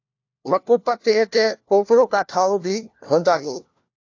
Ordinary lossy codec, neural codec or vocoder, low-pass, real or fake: AAC, 48 kbps; codec, 16 kHz, 1 kbps, FunCodec, trained on LibriTTS, 50 frames a second; 7.2 kHz; fake